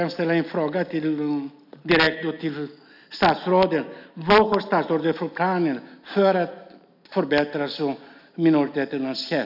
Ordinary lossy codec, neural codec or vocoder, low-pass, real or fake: none; none; 5.4 kHz; real